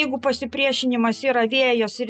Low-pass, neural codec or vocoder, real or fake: 9.9 kHz; none; real